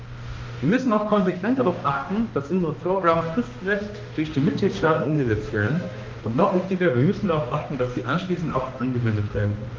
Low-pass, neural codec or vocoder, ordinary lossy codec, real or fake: 7.2 kHz; codec, 16 kHz, 1 kbps, X-Codec, HuBERT features, trained on balanced general audio; Opus, 32 kbps; fake